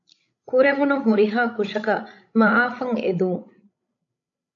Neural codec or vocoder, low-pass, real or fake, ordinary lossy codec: codec, 16 kHz, 16 kbps, FreqCodec, larger model; 7.2 kHz; fake; AAC, 48 kbps